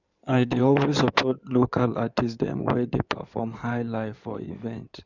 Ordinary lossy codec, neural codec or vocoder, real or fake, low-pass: none; codec, 16 kHz in and 24 kHz out, 2.2 kbps, FireRedTTS-2 codec; fake; 7.2 kHz